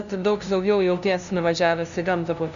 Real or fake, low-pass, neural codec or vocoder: fake; 7.2 kHz; codec, 16 kHz, 0.5 kbps, FunCodec, trained on LibriTTS, 25 frames a second